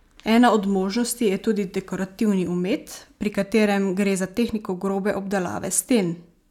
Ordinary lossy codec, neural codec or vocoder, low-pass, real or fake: none; none; 19.8 kHz; real